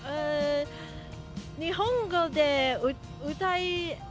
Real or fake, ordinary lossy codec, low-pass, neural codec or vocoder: real; none; none; none